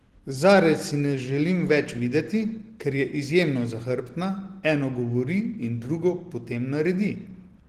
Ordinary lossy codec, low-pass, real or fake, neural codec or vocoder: Opus, 16 kbps; 14.4 kHz; real; none